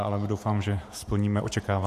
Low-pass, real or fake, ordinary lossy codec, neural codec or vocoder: 14.4 kHz; fake; AAC, 64 kbps; vocoder, 48 kHz, 128 mel bands, Vocos